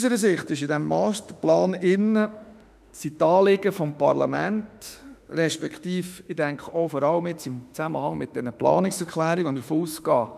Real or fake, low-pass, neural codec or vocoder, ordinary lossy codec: fake; 14.4 kHz; autoencoder, 48 kHz, 32 numbers a frame, DAC-VAE, trained on Japanese speech; none